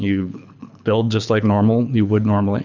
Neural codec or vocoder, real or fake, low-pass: codec, 24 kHz, 6 kbps, HILCodec; fake; 7.2 kHz